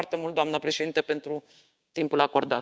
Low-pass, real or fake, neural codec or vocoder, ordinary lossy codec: none; fake; codec, 16 kHz, 6 kbps, DAC; none